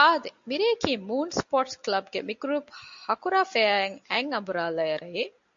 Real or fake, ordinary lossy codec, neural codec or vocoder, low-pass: real; AAC, 64 kbps; none; 7.2 kHz